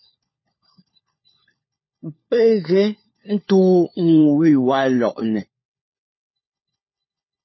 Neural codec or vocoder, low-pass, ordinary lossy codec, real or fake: codec, 16 kHz, 4 kbps, FunCodec, trained on LibriTTS, 50 frames a second; 7.2 kHz; MP3, 24 kbps; fake